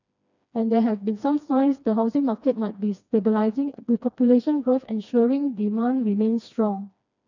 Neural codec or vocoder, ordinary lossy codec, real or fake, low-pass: codec, 16 kHz, 2 kbps, FreqCodec, smaller model; none; fake; 7.2 kHz